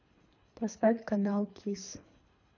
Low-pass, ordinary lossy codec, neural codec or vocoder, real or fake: 7.2 kHz; none; codec, 24 kHz, 3 kbps, HILCodec; fake